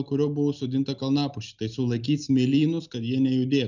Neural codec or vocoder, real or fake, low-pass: none; real; 7.2 kHz